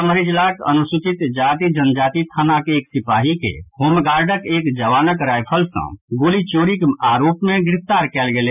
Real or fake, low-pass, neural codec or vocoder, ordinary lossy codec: real; 3.6 kHz; none; none